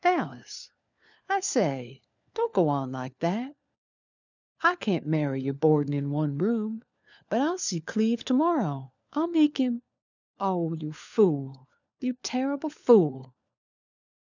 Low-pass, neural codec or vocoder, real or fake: 7.2 kHz; codec, 16 kHz, 4 kbps, FunCodec, trained on LibriTTS, 50 frames a second; fake